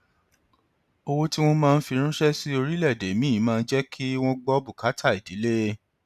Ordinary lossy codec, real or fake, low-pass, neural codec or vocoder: none; real; 14.4 kHz; none